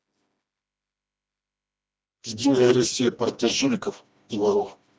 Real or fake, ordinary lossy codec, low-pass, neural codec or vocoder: fake; none; none; codec, 16 kHz, 1 kbps, FreqCodec, smaller model